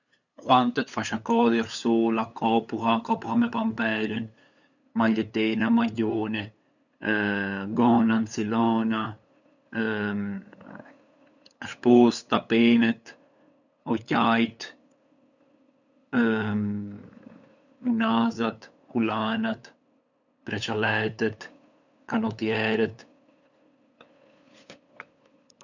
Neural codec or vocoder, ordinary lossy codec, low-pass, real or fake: codec, 16 kHz, 8 kbps, FunCodec, trained on LibriTTS, 25 frames a second; none; 7.2 kHz; fake